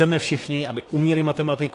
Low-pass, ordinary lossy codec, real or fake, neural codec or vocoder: 10.8 kHz; AAC, 48 kbps; fake; codec, 24 kHz, 1 kbps, SNAC